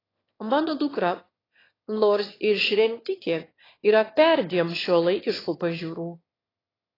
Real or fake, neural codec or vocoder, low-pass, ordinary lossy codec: fake; autoencoder, 22.05 kHz, a latent of 192 numbers a frame, VITS, trained on one speaker; 5.4 kHz; AAC, 24 kbps